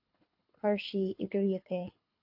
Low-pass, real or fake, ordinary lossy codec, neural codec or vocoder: 5.4 kHz; fake; AAC, 48 kbps; codec, 24 kHz, 6 kbps, HILCodec